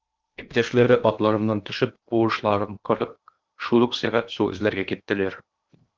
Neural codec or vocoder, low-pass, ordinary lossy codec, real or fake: codec, 16 kHz in and 24 kHz out, 0.8 kbps, FocalCodec, streaming, 65536 codes; 7.2 kHz; Opus, 32 kbps; fake